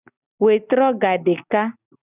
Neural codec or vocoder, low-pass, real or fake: none; 3.6 kHz; real